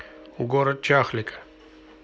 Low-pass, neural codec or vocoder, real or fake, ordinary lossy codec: none; none; real; none